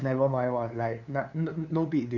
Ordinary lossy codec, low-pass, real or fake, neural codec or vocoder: none; 7.2 kHz; fake; codec, 16 kHz, 2 kbps, FunCodec, trained on LibriTTS, 25 frames a second